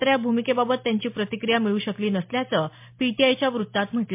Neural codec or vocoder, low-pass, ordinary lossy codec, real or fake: none; 3.6 kHz; MP3, 32 kbps; real